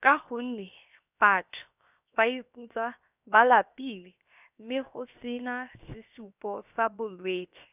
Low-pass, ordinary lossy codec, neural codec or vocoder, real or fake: 3.6 kHz; none; codec, 16 kHz, 0.7 kbps, FocalCodec; fake